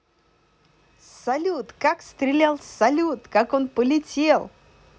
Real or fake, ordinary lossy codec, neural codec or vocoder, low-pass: real; none; none; none